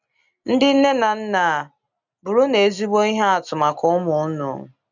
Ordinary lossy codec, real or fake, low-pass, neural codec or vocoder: none; real; 7.2 kHz; none